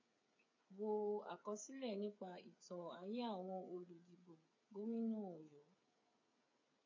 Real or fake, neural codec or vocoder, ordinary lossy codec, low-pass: fake; codec, 16 kHz, 8 kbps, FreqCodec, smaller model; AAC, 48 kbps; 7.2 kHz